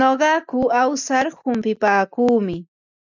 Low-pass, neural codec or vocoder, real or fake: 7.2 kHz; none; real